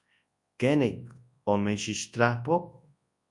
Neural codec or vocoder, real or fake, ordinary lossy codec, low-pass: codec, 24 kHz, 0.9 kbps, WavTokenizer, large speech release; fake; MP3, 64 kbps; 10.8 kHz